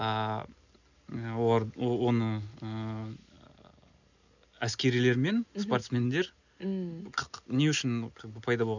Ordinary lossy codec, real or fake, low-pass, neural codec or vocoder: none; real; 7.2 kHz; none